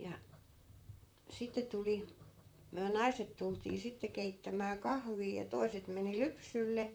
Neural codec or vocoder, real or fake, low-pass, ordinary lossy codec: vocoder, 44.1 kHz, 128 mel bands, Pupu-Vocoder; fake; none; none